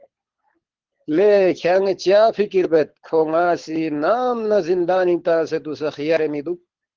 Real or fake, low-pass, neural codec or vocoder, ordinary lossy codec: fake; 7.2 kHz; codec, 24 kHz, 6 kbps, HILCodec; Opus, 16 kbps